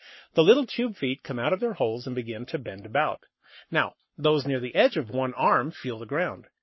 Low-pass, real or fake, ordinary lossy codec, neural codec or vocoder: 7.2 kHz; fake; MP3, 24 kbps; codec, 44.1 kHz, 7.8 kbps, Pupu-Codec